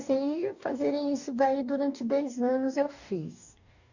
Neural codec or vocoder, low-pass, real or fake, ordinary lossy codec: codec, 44.1 kHz, 2.6 kbps, DAC; 7.2 kHz; fake; none